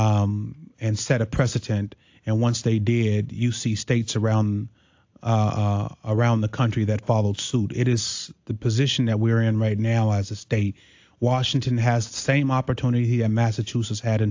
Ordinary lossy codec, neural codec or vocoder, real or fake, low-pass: AAC, 48 kbps; none; real; 7.2 kHz